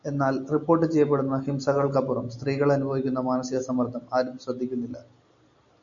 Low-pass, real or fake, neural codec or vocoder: 7.2 kHz; real; none